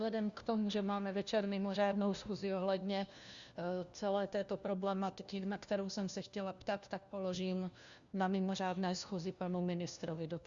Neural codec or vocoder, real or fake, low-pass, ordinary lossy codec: codec, 16 kHz, 1 kbps, FunCodec, trained on LibriTTS, 50 frames a second; fake; 7.2 kHz; Opus, 64 kbps